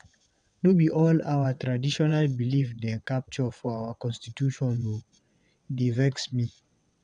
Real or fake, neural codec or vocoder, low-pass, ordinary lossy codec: fake; vocoder, 22.05 kHz, 80 mel bands, WaveNeXt; 9.9 kHz; none